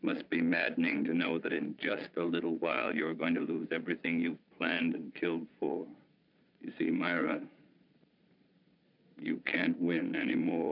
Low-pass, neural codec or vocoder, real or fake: 5.4 kHz; vocoder, 22.05 kHz, 80 mel bands, Vocos; fake